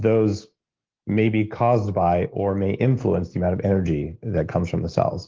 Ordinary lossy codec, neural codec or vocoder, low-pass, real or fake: Opus, 16 kbps; none; 7.2 kHz; real